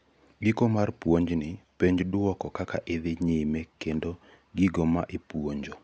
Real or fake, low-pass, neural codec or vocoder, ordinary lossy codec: real; none; none; none